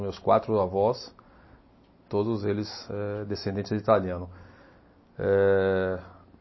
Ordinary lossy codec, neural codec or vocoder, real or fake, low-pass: MP3, 24 kbps; none; real; 7.2 kHz